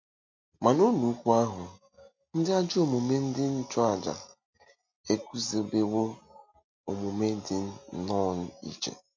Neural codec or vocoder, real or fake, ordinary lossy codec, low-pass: none; real; MP3, 48 kbps; 7.2 kHz